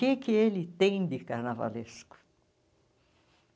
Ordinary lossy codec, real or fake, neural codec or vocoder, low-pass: none; real; none; none